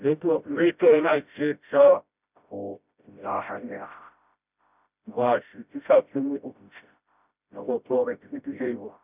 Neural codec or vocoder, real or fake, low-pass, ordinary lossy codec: codec, 16 kHz, 0.5 kbps, FreqCodec, smaller model; fake; 3.6 kHz; none